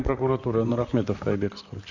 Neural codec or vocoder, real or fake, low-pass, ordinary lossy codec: vocoder, 44.1 kHz, 128 mel bands, Pupu-Vocoder; fake; 7.2 kHz; none